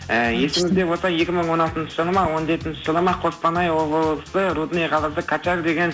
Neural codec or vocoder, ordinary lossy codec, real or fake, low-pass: none; none; real; none